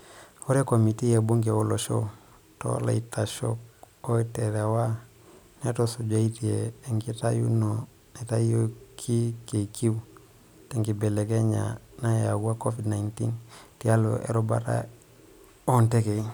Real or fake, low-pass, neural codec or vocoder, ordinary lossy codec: real; none; none; none